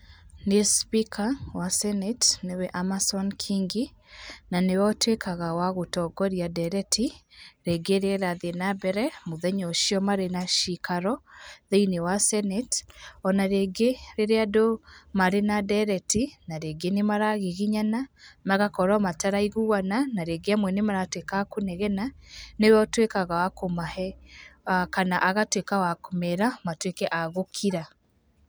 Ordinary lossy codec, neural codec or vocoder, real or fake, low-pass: none; none; real; none